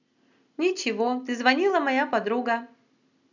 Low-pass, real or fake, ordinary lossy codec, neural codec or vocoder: 7.2 kHz; real; none; none